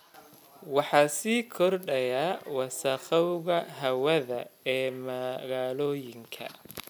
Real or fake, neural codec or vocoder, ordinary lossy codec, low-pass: real; none; none; 19.8 kHz